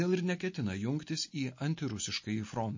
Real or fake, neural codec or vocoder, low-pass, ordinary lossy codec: real; none; 7.2 kHz; MP3, 32 kbps